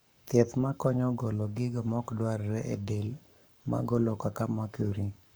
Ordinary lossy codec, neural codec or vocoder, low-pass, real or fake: none; codec, 44.1 kHz, 7.8 kbps, Pupu-Codec; none; fake